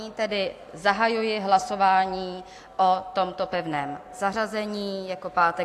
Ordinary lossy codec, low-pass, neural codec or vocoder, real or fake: AAC, 64 kbps; 14.4 kHz; none; real